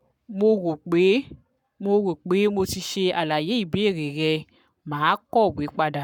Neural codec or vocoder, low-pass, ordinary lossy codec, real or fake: codec, 44.1 kHz, 7.8 kbps, Pupu-Codec; 19.8 kHz; none; fake